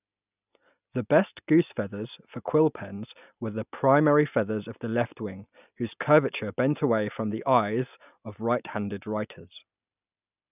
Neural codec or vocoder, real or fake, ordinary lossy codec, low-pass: none; real; none; 3.6 kHz